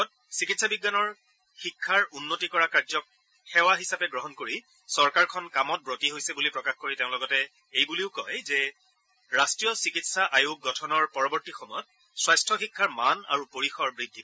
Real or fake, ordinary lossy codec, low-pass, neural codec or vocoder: real; none; none; none